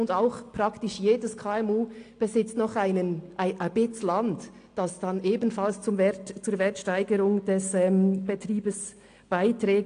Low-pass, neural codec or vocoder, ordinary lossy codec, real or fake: 9.9 kHz; none; AAC, 48 kbps; real